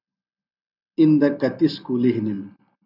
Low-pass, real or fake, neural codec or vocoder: 5.4 kHz; real; none